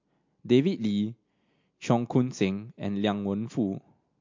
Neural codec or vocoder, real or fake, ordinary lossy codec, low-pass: none; real; MP3, 48 kbps; 7.2 kHz